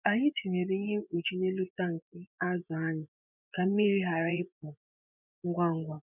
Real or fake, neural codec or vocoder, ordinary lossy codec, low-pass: fake; vocoder, 24 kHz, 100 mel bands, Vocos; none; 3.6 kHz